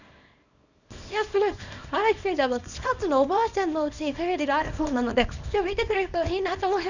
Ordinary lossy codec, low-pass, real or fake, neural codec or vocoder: none; 7.2 kHz; fake; codec, 24 kHz, 0.9 kbps, WavTokenizer, small release